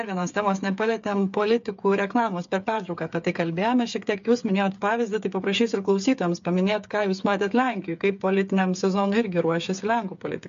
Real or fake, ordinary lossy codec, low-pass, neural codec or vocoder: fake; MP3, 48 kbps; 7.2 kHz; codec, 16 kHz, 8 kbps, FreqCodec, smaller model